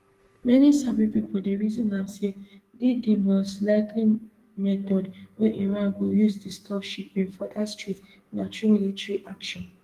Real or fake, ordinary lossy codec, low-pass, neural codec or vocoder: fake; Opus, 24 kbps; 14.4 kHz; codec, 44.1 kHz, 2.6 kbps, SNAC